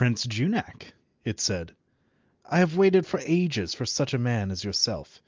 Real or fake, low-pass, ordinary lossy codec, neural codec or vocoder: real; 7.2 kHz; Opus, 24 kbps; none